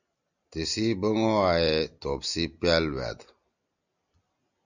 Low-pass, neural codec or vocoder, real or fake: 7.2 kHz; none; real